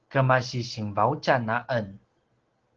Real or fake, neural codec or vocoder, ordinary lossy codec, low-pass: real; none; Opus, 16 kbps; 7.2 kHz